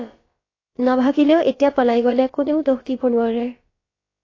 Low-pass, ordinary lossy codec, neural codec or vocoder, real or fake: 7.2 kHz; AAC, 32 kbps; codec, 16 kHz, about 1 kbps, DyCAST, with the encoder's durations; fake